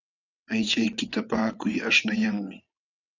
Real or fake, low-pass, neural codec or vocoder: fake; 7.2 kHz; vocoder, 22.05 kHz, 80 mel bands, WaveNeXt